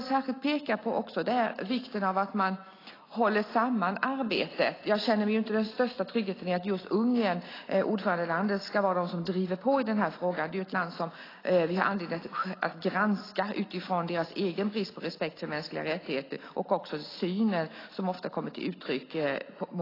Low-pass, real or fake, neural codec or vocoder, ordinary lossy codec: 5.4 kHz; real; none; AAC, 24 kbps